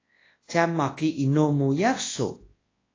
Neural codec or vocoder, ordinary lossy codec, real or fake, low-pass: codec, 24 kHz, 0.9 kbps, WavTokenizer, large speech release; AAC, 32 kbps; fake; 7.2 kHz